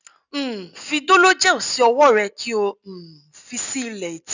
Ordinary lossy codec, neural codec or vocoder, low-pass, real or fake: none; none; 7.2 kHz; real